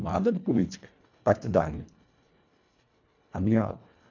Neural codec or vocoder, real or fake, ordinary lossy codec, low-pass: codec, 24 kHz, 1.5 kbps, HILCodec; fake; none; 7.2 kHz